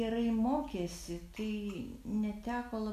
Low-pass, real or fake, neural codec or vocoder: 14.4 kHz; real; none